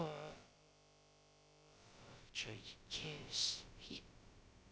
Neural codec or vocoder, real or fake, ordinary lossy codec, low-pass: codec, 16 kHz, about 1 kbps, DyCAST, with the encoder's durations; fake; none; none